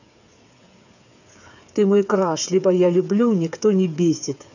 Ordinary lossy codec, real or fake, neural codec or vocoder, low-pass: none; fake; codec, 16 kHz, 8 kbps, FreqCodec, smaller model; 7.2 kHz